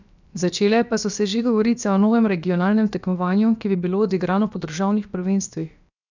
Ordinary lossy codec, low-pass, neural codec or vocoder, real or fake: none; 7.2 kHz; codec, 16 kHz, about 1 kbps, DyCAST, with the encoder's durations; fake